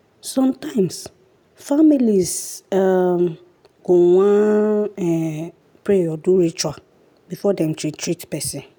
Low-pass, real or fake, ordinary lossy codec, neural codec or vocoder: none; real; none; none